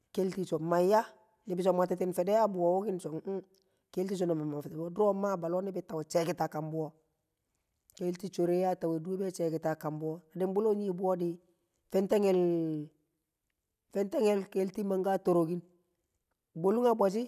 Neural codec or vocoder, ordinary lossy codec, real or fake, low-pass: none; none; real; 14.4 kHz